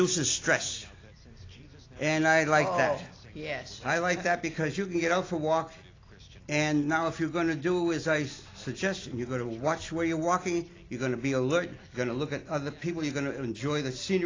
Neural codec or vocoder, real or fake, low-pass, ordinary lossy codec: none; real; 7.2 kHz; AAC, 32 kbps